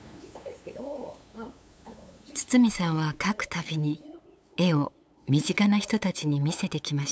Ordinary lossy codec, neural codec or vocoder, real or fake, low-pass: none; codec, 16 kHz, 8 kbps, FunCodec, trained on LibriTTS, 25 frames a second; fake; none